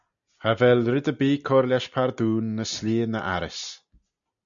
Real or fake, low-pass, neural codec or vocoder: real; 7.2 kHz; none